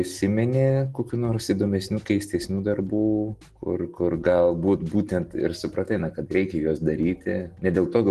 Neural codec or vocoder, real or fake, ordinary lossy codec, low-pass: none; real; Opus, 16 kbps; 10.8 kHz